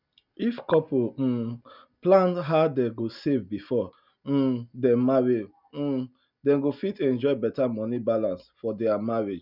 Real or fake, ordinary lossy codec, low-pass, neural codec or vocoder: real; none; 5.4 kHz; none